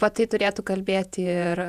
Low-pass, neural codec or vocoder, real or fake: 14.4 kHz; vocoder, 48 kHz, 128 mel bands, Vocos; fake